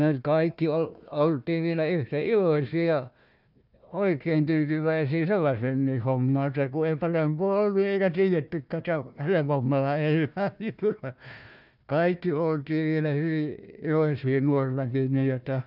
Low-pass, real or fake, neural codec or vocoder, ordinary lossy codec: 5.4 kHz; fake; codec, 16 kHz, 1 kbps, FunCodec, trained on Chinese and English, 50 frames a second; none